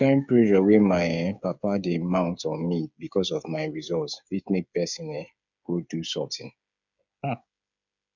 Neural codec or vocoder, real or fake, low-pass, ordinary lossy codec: codec, 16 kHz, 8 kbps, FreqCodec, smaller model; fake; 7.2 kHz; none